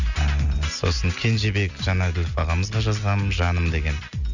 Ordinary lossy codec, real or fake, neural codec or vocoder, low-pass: none; real; none; 7.2 kHz